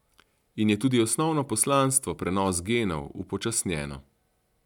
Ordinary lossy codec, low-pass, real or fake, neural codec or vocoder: none; 19.8 kHz; real; none